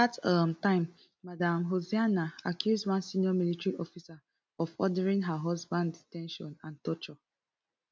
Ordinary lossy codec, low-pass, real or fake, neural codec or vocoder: none; none; real; none